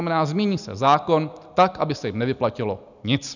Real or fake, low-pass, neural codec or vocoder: real; 7.2 kHz; none